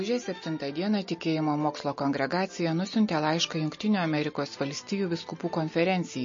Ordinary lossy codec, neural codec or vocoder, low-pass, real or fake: MP3, 32 kbps; none; 7.2 kHz; real